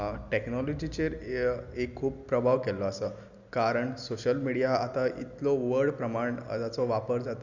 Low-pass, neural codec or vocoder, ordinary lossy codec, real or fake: 7.2 kHz; none; Opus, 64 kbps; real